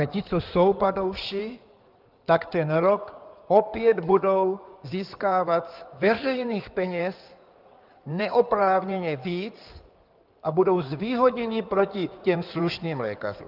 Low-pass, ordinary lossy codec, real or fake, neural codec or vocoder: 5.4 kHz; Opus, 24 kbps; fake; codec, 16 kHz in and 24 kHz out, 2.2 kbps, FireRedTTS-2 codec